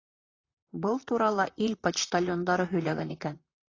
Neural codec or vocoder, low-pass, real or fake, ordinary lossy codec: vocoder, 44.1 kHz, 128 mel bands, Pupu-Vocoder; 7.2 kHz; fake; AAC, 32 kbps